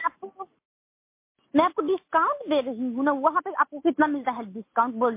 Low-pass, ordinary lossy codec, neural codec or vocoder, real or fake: 3.6 kHz; MP3, 24 kbps; none; real